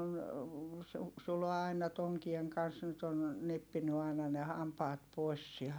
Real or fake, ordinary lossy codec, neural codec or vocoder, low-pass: real; none; none; none